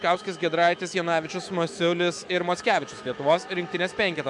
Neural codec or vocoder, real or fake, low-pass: autoencoder, 48 kHz, 128 numbers a frame, DAC-VAE, trained on Japanese speech; fake; 10.8 kHz